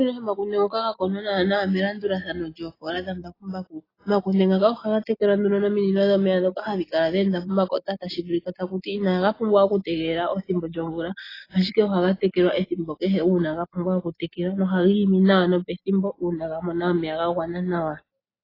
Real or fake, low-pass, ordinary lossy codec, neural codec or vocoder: real; 5.4 kHz; AAC, 24 kbps; none